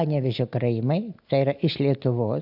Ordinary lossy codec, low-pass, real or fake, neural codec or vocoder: AAC, 48 kbps; 5.4 kHz; real; none